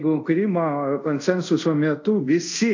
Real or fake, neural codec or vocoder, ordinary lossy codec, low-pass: fake; codec, 24 kHz, 0.5 kbps, DualCodec; AAC, 48 kbps; 7.2 kHz